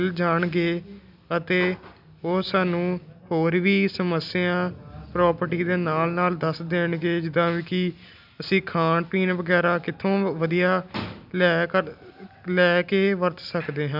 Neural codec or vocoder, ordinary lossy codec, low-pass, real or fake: none; none; 5.4 kHz; real